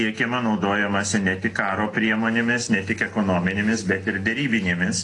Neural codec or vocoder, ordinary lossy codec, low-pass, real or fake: none; AAC, 32 kbps; 10.8 kHz; real